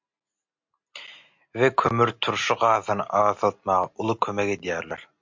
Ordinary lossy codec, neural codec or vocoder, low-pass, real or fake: MP3, 48 kbps; none; 7.2 kHz; real